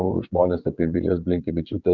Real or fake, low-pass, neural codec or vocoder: fake; 7.2 kHz; vocoder, 22.05 kHz, 80 mel bands, WaveNeXt